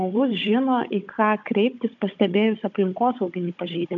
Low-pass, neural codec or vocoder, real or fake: 7.2 kHz; codec, 16 kHz, 16 kbps, FunCodec, trained on Chinese and English, 50 frames a second; fake